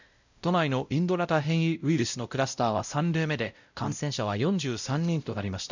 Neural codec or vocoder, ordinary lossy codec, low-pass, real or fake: codec, 16 kHz, 0.5 kbps, X-Codec, WavLM features, trained on Multilingual LibriSpeech; none; 7.2 kHz; fake